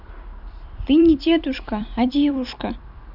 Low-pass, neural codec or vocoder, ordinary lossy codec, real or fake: 5.4 kHz; vocoder, 44.1 kHz, 128 mel bands every 256 samples, BigVGAN v2; none; fake